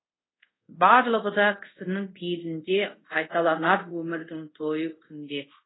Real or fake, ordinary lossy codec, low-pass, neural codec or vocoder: fake; AAC, 16 kbps; 7.2 kHz; codec, 24 kHz, 0.5 kbps, DualCodec